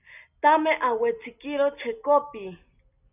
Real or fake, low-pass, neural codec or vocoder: real; 3.6 kHz; none